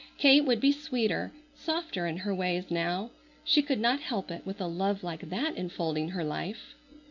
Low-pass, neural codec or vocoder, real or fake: 7.2 kHz; none; real